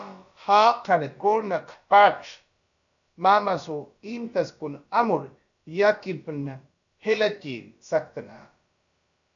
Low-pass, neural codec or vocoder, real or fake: 7.2 kHz; codec, 16 kHz, about 1 kbps, DyCAST, with the encoder's durations; fake